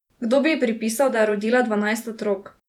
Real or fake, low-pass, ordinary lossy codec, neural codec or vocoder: fake; 19.8 kHz; none; vocoder, 48 kHz, 128 mel bands, Vocos